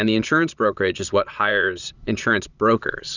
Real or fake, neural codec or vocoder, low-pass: fake; vocoder, 22.05 kHz, 80 mel bands, Vocos; 7.2 kHz